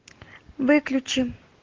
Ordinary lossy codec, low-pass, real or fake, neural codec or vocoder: Opus, 16 kbps; 7.2 kHz; real; none